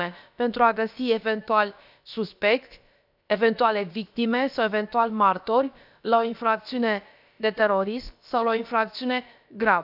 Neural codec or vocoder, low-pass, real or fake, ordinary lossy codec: codec, 16 kHz, about 1 kbps, DyCAST, with the encoder's durations; 5.4 kHz; fake; none